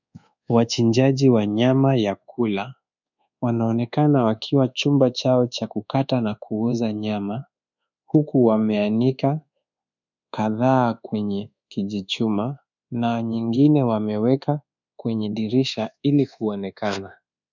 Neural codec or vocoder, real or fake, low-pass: codec, 24 kHz, 1.2 kbps, DualCodec; fake; 7.2 kHz